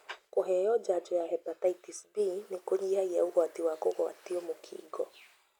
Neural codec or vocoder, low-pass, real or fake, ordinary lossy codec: none; 19.8 kHz; real; none